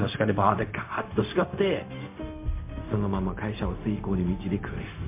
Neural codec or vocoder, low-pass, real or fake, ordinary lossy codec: codec, 16 kHz, 0.4 kbps, LongCat-Audio-Codec; 3.6 kHz; fake; MP3, 24 kbps